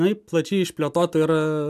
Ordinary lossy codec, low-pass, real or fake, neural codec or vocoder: AAC, 96 kbps; 14.4 kHz; real; none